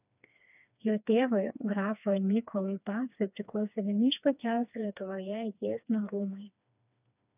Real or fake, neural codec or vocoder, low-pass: fake; codec, 16 kHz, 2 kbps, FreqCodec, smaller model; 3.6 kHz